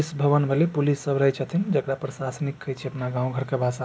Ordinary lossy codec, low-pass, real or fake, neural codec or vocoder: none; none; real; none